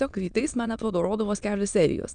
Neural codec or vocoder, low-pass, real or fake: autoencoder, 22.05 kHz, a latent of 192 numbers a frame, VITS, trained on many speakers; 9.9 kHz; fake